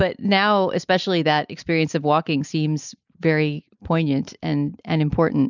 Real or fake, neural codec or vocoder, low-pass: real; none; 7.2 kHz